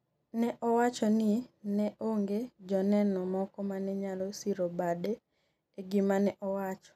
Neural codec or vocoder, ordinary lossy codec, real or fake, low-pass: none; none; real; 14.4 kHz